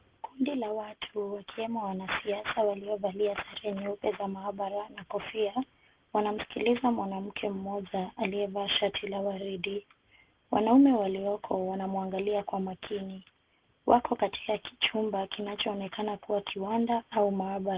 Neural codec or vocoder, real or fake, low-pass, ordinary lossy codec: none; real; 3.6 kHz; Opus, 16 kbps